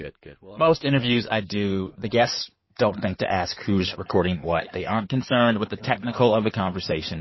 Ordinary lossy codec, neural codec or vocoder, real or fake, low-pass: MP3, 24 kbps; codec, 16 kHz in and 24 kHz out, 2.2 kbps, FireRedTTS-2 codec; fake; 7.2 kHz